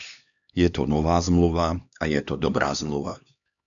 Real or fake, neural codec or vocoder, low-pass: fake; codec, 16 kHz, 2 kbps, X-Codec, HuBERT features, trained on LibriSpeech; 7.2 kHz